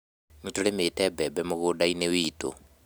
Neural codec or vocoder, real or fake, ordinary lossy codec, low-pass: none; real; none; none